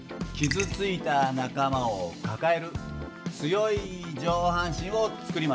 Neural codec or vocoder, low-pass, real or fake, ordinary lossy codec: none; none; real; none